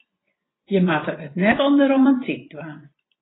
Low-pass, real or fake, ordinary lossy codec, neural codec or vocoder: 7.2 kHz; fake; AAC, 16 kbps; vocoder, 24 kHz, 100 mel bands, Vocos